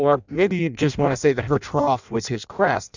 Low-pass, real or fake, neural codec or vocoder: 7.2 kHz; fake; codec, 16 kHz in and 24 kHz out, 0.6 kbps, FireRedTTS-2 codec